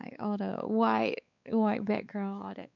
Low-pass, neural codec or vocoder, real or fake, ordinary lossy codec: 7.2 kHz; codec, 16 kHz, 4 kbps, X-Codec, WavLM features, trained on Multilingual LibriSpeech; fake; none